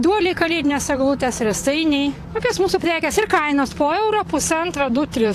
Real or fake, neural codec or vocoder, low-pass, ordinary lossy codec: fake; vocoder, 44.1 kHz, 128 mel bands, Pupu-Vocoder; 14.4 kHz; AAC, 64 kbps